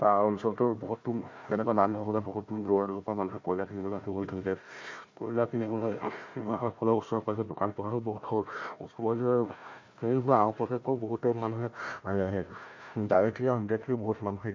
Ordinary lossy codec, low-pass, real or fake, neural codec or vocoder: MP3, 48 kbps; 7.2 kHz; fake; codec, 16 kHz, 1 kbps, FunCodec, trained on Chinese and English, 50 frames a second